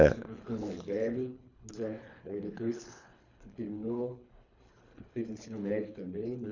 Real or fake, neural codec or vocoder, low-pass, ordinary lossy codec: fake; codec, 24 kHz, 3 kbps, HILCodec; 7.2 kHz; none